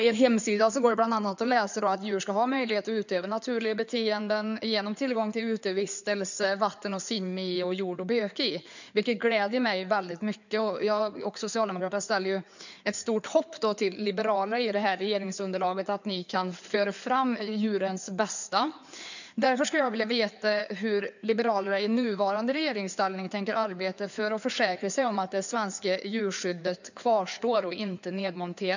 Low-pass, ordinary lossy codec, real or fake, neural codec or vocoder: 7.2 kHz; none; fake; codec, 16 kHz in and 24 kHz out, 2.2 kbps, FireRedTTS-2 codec